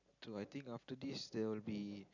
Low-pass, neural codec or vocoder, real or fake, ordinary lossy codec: 7.2 kHz; none; real; none